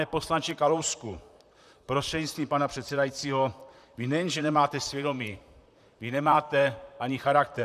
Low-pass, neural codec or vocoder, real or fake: 14.4 kHz; vocoder, 44.1 kHz, 128 mel bands, Pupu-Vocoder; fake